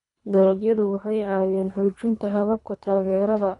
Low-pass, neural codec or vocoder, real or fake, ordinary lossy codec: 10.8 kHz; codec, 24 kHz, 3 kbps, HILCodec; fake; none